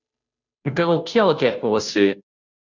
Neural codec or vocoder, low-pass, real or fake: codec, 16 kHz, 0.5 kbps, FunCodec, trained on Chinese and English, 25 frames a second; 7.2 kHz; fake